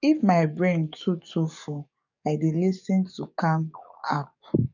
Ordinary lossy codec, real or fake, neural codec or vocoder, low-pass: none; fake; codec, 44.1 kHz, 7.8 kbps, DAC; 7.2 kHz